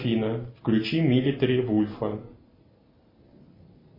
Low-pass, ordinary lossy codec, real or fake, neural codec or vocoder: 5.4 kHz; MP3, 24 kbps; real; none